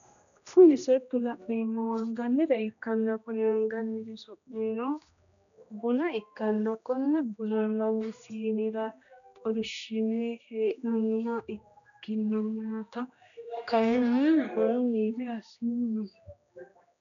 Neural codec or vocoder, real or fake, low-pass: codec, 16 kHz, 1 kbps, X-Codec, HuBERT features, trained on general audio; fake; 7.2 kHz